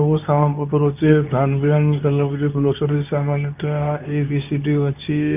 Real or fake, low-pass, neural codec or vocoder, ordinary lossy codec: fake; 3.6 kHz; codec, 24 kHz, 0.9 kbps, WavTokenizer, medium speech release version 2; none